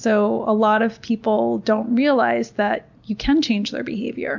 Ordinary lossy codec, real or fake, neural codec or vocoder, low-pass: MP3, 64 kbps; real; none; 7.2 kHz